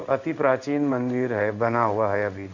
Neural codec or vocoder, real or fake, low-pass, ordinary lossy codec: codec, 16 kHz in and 24 kHz out, 1 kbps, XY-Tokenizer; fake; 7.2 kHz; none